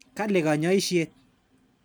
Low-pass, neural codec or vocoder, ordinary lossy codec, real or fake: none; none; none; real